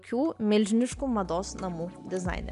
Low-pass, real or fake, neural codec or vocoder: 10.8 kHz; real; none